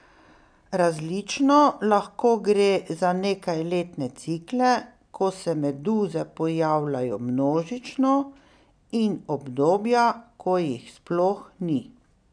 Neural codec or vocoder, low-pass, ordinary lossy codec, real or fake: none; 9.9 kHz; none; real